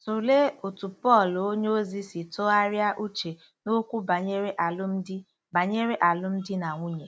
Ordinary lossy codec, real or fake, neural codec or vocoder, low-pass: none; real; none; none